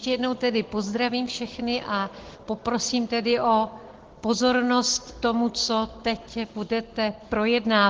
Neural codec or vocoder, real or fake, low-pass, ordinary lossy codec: none; real; 7.2 kHz; Opus, 16 kbps